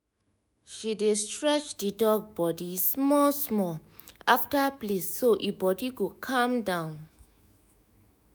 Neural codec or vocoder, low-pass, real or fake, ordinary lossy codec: autoencoder, 48 kHz, 128 numbers a frame, DAC-VAE, trained on Japanese speech; none; fake; none